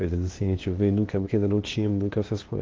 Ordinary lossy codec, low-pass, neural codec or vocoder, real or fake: Opus, 16 kbps; 7.2 kHz; codec, 16 kHz, 0.8 kbps, ZipCodec; fake